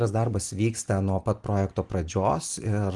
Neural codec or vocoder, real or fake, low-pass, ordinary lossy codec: none; real; 10.8 kHz; Opus, 24 kbps